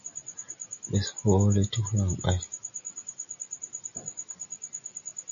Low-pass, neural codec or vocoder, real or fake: 7.2 kHz; none; real